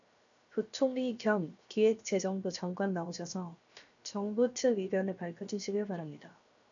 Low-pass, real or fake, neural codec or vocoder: 7.2 kHz; fake; codec, 16 kHz, 0.7 kbps, FocalCodec